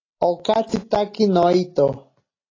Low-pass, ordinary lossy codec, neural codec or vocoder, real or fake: 7.2 kHz; AAC, 32 kbps; none; real